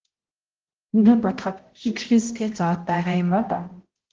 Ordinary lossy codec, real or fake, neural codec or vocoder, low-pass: Opus, 16 kbps; fake; codec, 16 kHz, 0.5 kbps, X-Codec, HuBERT features, trained on balanced general audio; 7.2 kHz